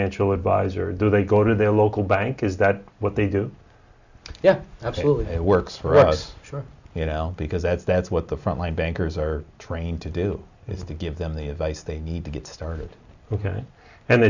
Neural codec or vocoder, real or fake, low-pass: none; real; 7.2 kHz